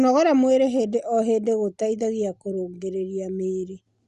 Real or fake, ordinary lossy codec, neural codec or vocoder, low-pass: real; Opus, 64 kbps; none; 10.8 kHz